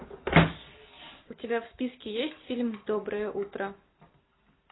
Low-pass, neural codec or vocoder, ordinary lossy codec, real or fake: 7.2 kHz; none; AAC, 16 kbps; real